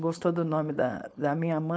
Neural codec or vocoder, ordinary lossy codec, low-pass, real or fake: codec, 16 kHz, 4.8 kbps, FACodec; none; none; fake